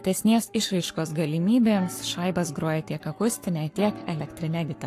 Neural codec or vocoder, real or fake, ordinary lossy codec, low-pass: codec, 44.1 kHz, 7.8 kbps, Pupu-Codec; fake; AAC, 64 kbps; 14.4 kHz